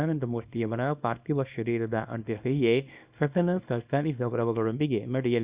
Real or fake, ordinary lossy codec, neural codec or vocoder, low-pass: fake; Opus, 64 kbps; codec, 24 kHz, 0.9 kbps, WavTokenizer, small release; 3.6 kHz